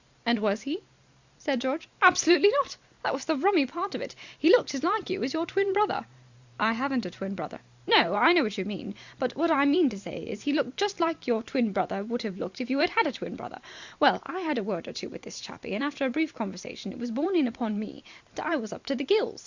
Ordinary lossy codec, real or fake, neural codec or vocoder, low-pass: Opus, 64 kbps; fake; vocoder, 44.1 kHz, 128 mel bands every 512 samples, BigVGAN v2; 7.2 kHz